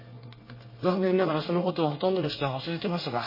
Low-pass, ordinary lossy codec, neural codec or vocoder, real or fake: 5.4 kHz; MP3, 24 kbps; codec, 24 kHz, 1 kbps, SNAC; fake